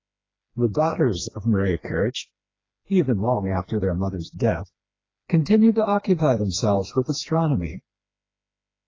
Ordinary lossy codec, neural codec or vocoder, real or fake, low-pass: AAC, 32 kbps; codec, 16 kHz, 2 kbps, FreqCodec, smaller model; fake; 7.2 kHz